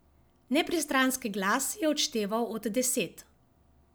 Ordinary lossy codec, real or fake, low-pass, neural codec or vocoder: none; real; none; none